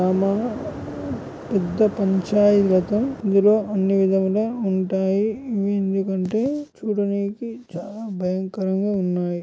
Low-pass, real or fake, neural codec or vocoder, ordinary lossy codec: none; real; none; none